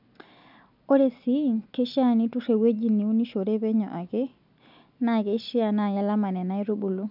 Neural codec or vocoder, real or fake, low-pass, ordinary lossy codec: none; real; 5.4 kHz; none